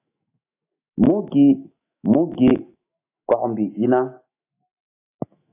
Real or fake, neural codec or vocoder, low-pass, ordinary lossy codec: fake; autoencoder, 48 kHz, 128 numbers a frame, DAC-VAE, trained on Japanese speech; 3.6 kHz; AAC, 24 kbps